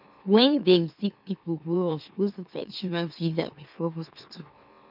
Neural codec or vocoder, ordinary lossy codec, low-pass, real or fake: autoencoder, 44.1 kHz, a latent of 192 numbers a frame, MeloTTS; none; 5.4 kHz; fake